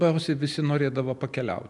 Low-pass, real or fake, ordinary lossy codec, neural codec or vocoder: 10.8 kHz; real; MP3, 96 kbps; none